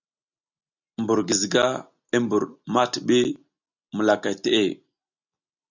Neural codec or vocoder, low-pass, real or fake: none; 7.2 kHz; real